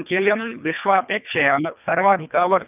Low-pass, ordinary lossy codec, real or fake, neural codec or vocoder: 3.6 kHz; none; fake; codec, 24 kHz, 1.5 kbps, HILCodec